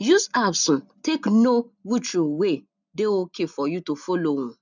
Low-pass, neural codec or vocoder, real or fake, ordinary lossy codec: 7.2 kHz; none; real; none